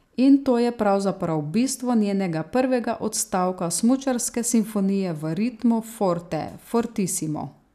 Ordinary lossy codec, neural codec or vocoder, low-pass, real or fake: none; none; 14.4 kHz; real